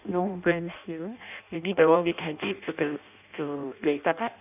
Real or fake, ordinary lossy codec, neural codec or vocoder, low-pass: fake; none; codec, 16 kHz in and 24 kHz out, 0.6 kbps, FireRedTTS-2 codec; 3.6 kHz